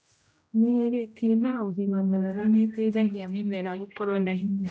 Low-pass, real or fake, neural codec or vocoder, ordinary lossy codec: none; fake; codec, 16 kHz, 0.5 kbps, X-Codec, HuBERT features, trained on general audio; none